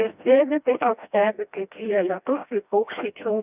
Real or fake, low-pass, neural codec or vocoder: fake; 3.6 kHz; codec, 16 kHz, 1 kbps, FreqCodec, smaller model